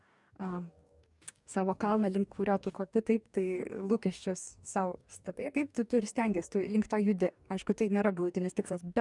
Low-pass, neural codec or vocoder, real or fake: 10.8 kHz; codec, 44.1 kHz, 2.6 kbps, DAC; fake